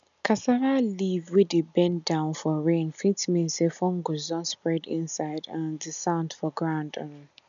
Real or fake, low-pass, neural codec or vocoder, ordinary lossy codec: real; 7.2 kHz; none; none